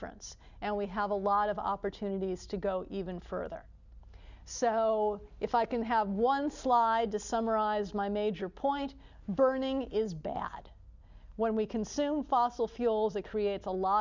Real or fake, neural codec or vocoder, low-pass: real; none; 7.2 kHz